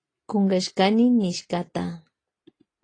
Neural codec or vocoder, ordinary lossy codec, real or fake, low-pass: none; AAC, 32 kbps; real; 9.9 kHz